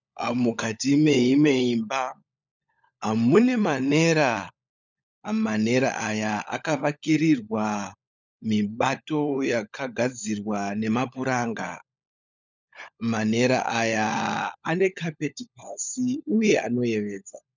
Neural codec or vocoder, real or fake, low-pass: codec, 16 kHz, 16 kbps, FunCodec, trained on LibriTTS, 50 frames a second; fake; 7.2 kHz